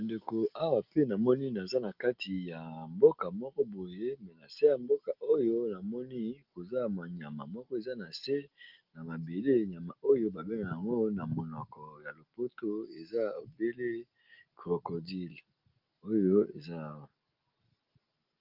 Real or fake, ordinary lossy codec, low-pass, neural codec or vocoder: real; Opus, 32 kbps; 5.4 kHz; none